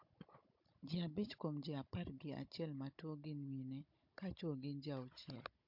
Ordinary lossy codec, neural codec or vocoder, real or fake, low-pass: MP3, 48 kbps; codec, 16 kHz, 16 kbps, FunCodec, trained on Chinese and English, 50 frames a second; fake; 5.4 kHz